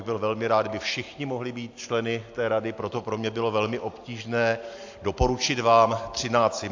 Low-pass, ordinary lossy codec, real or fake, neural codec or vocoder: 7.2 kHz; AAC, 48 kbps; real; none